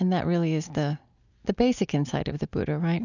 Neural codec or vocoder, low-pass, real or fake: none; 7.2 kHz; real